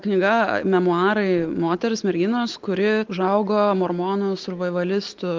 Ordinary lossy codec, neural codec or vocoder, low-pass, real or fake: Opus, 32 kbps; none; 7.2 kHz; real